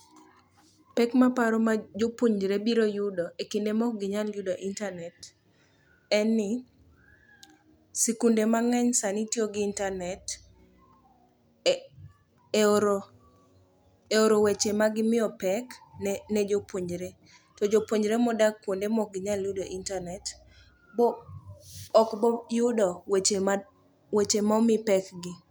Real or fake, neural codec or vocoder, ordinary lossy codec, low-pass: real; none; none; none